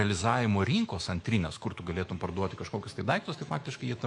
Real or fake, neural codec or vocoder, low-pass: fake; autoencoder, 48 kHz, 128 numbers a frame, DAC-VAE, trained on Japanese speech; 10.8 kHz